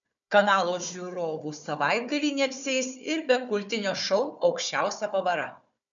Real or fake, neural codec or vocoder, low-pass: fake; codec, 16 kHz, 4 kbps, FunCodec, trained on Chinese and English, 50 frames a second; 7.2 kHz